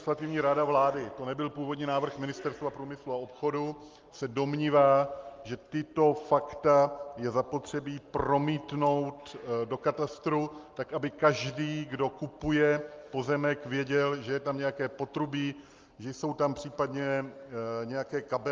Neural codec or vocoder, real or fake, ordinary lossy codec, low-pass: none; real; Opus, 32 kbps; 7.2 kHz